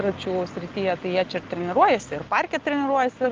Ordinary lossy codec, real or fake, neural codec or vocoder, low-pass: Opus, 32 kbps; real; none; 7.2 kHz